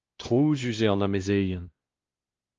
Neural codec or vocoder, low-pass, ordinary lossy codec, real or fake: codec, 16 kHz, 1 kbps, X-Codec, WavLM features, trained on Multilingual LibriSpeech; 7.2 kHz; Opus, 24 kbps; fake